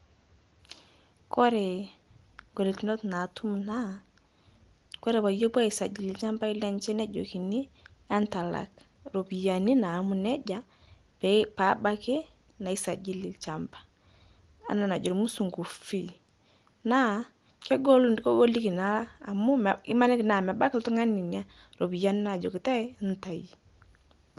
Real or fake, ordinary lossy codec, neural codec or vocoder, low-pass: real; Opus, 24 kbps; none; 10.8 kHz